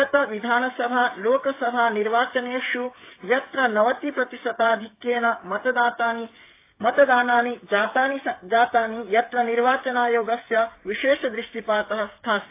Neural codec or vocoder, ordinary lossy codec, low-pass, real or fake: codec, 16 kHz, 8 kbps, FreqCodec, smaller model; AAC, 24 kbps; 3.6 kHz; fake